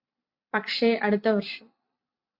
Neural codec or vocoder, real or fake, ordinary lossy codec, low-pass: vocoder, 44.1 kHz, 128 mel bands every 256 samples, BigVGAN v2; fake; MP3, 48 kbps; 5.4 kHz